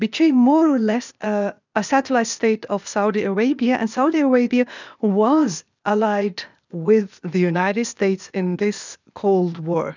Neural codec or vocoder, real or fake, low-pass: codec, 16 kHz, 0.8 kbps, ZipCodec; fake; 7.2 kHz